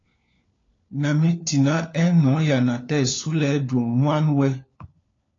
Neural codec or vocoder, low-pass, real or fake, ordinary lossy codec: codec, 16 kHz, 4 kbps, FunCodec, trained on LibriTTS, 50 frames a second; 7.2 kHz; fake; AAC, 32 kbps